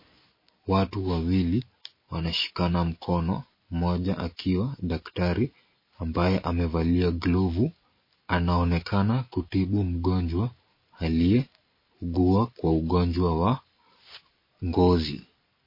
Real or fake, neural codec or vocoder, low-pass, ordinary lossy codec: real; none; 5.4 kHz; MP3, 24 kbps